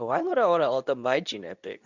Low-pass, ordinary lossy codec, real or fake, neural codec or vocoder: 7.2 kHz; none; fake; codec, 24 kHz, 0.9 kbps, WavTokenizer, medium speech release version 2